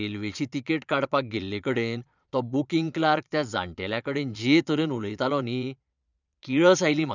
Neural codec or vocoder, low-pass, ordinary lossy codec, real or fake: vocoder, 22.05 kHz, 80 mel bands, Vocos; 7.2 kHz; none; fake